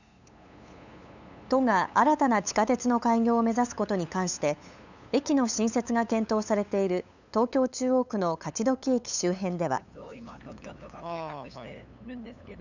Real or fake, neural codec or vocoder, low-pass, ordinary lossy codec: fake; codec, 16 kHz, 8 kbps, FunCodec, trained on LibriTTS, 25 frames a second; 7.2 kHz; none